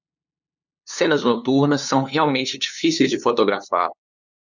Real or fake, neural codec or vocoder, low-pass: fake; codec, 16 kHz, 2 kbps, FunCodec, trained on LibriTTS, 25 frames a second; 7.2 kHz